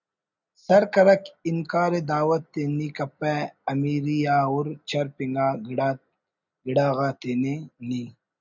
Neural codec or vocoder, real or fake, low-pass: none; real; 7.2 kHz